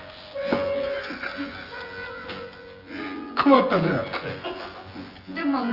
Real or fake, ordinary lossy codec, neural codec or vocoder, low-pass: fake; Opus, 24 kbps; vocoder, 24 kHz, 100 mel bands, Vocos; 5.4 kHz